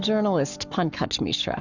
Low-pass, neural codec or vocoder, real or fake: 7.2 kHz; codec, 44.1 kHz, 7.8 kbps, Pupu-Codec; fake